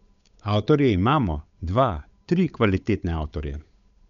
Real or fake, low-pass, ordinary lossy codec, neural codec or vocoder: fake; 7.2 kHz; none; codec, 16 kHz, 8 kbps, FunCodec, trained on Chinese and English, 25 frames a second